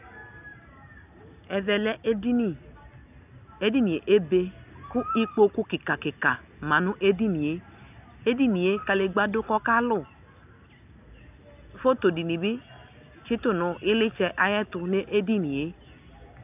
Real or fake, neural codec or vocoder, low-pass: real; none; 3.6 kHz